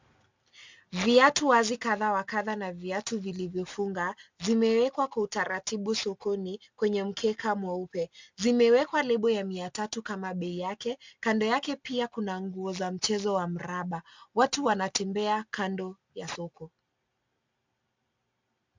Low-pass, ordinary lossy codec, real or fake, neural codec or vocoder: 7.2 kHz; MP3, 64 kbps; real; none